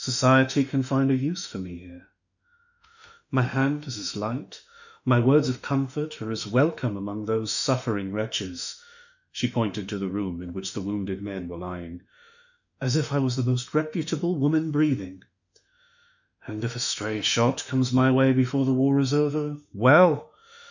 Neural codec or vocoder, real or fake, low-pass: autoencoder, 48 kHz, 32 numbers a frame, DAC-VAE, trained on Japanese speech; fake; 7.2 kHz